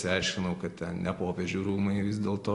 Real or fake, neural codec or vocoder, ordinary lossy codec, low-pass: real; none; AAC, 48 kbps; 10.8 kHz